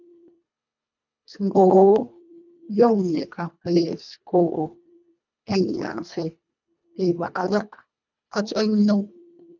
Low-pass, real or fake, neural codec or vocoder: 7.2 kHz; fake; codec, 24 kHz, 1.5 kbps, HILCodec